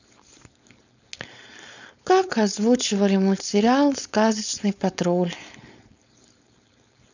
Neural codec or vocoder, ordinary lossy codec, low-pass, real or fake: codec, 16 kHz, 4.8 kbps, FACodec; none; 7.2 kHz; fake